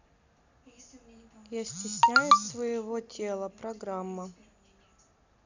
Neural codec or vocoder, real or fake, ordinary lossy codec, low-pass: none; real; none; 7.2 kHz